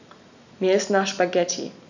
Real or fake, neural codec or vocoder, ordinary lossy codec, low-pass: real; none; none; 7.2 kHz